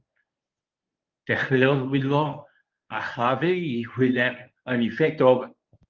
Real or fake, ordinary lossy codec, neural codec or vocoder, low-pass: fake; Opus, 32 kbps; codec, 24 kHz, 0.9 kbps, WavTokenizer, medium speech release version 1; 7.2 kHz